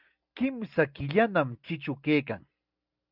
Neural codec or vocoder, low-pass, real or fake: none; 5.4 kHz; real